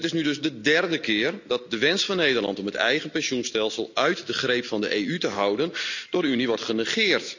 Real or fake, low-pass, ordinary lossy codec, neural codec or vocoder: real; 7.2 kHz; none; none